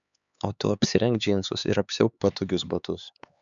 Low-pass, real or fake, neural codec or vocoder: 7.2 kHz; fake; codec, 16 kHz, 4 kbps, X-Codec, HuBERT features, trained on LibriSpeech